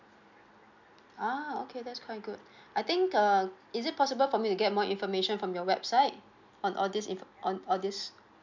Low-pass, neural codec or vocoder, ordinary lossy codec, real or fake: 7.2 kHz; none; MP3, 64 kbps; real